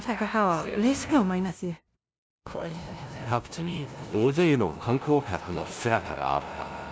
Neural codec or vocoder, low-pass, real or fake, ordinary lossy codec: codec, 16 kHz, 0.5 kbps, FunCodec, trained on LibriTTS, 25 frames a second; none; fake; none